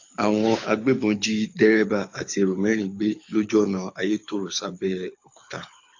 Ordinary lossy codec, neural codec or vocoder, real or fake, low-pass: AAC, 48 kbps; codec, 24 kHz, 6 kbps, HILCodec; fake; 7.2 kHz